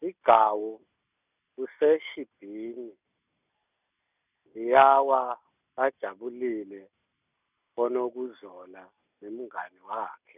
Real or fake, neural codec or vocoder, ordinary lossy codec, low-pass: real; none; none; 3.6 kHz